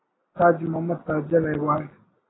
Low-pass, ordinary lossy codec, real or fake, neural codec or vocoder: 7.2 kHz; AAC, 16 kbps; real; none